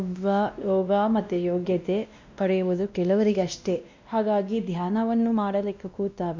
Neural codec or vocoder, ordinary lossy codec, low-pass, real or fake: codec, 16 kHz, 1 kbps, X-Codec, WavLM features, trained on Multilingual LibriSpeech; MP3, 64 kbps; 7.2 kHz; fake